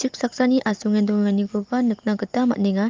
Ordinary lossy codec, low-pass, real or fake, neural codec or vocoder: Opus, 24 kbps; 7.2 kHz; real; none